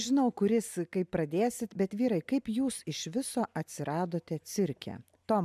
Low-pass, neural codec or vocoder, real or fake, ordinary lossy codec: 14.4 kHz; none; real; MP3, 96 kbps